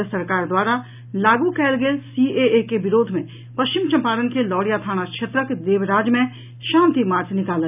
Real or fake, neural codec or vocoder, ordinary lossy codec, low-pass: real; none; none; 3.6 kHz